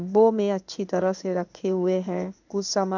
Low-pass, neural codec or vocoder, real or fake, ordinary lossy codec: 7.2 kHz; codec, 16 kHz in and 24 kHz out, 1 kbps, XY-Tokenizer; fake; none